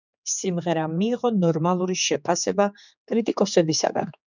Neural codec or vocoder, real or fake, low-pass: codec, 16 kHz, 4 kbps, X-Codec, HuBERT features, trained on general audio; fake; 7.2 kHz